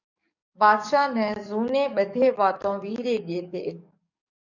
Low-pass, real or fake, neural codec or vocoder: 7.2 kHz; fake; codec, 44.1 kHz, 7.8 kbps, DAC